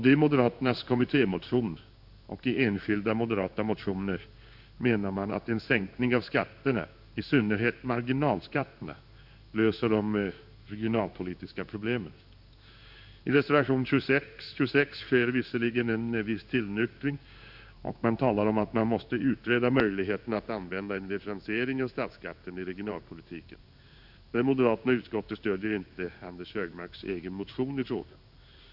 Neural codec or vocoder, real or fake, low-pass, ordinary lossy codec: codec, 16 kHz in and 24 kHz out, 1 kbps, XY-Tokenizer; fake; 5.4 kHz; none